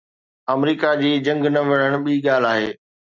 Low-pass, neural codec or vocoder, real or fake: 7.2 kHz; none; real